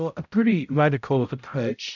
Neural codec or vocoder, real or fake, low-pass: codec, 16 kHz, 0.5 kbps, X-Codec, HuBERT features, trained on balanced general audio; fake; 7.2 kHz